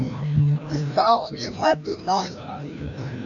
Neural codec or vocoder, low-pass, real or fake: codec, 16 kHz, 1 kbps, FreqCodec, larger model; 7.2 kHz; fake